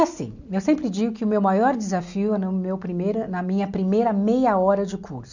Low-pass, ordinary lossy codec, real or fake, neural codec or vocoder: 7.2 kHz; none; real; none